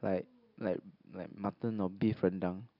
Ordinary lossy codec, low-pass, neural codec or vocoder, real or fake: none; 5.4 kHz; none; real